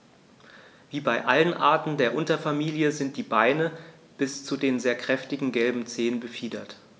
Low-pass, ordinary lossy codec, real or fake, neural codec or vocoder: none; none; real; none